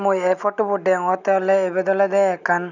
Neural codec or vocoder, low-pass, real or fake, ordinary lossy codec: none; 7.2 kHz; real; none